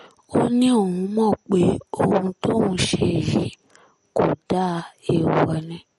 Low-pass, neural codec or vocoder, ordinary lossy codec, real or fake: 19.8 kHz; none; MP3, 48 kbps; real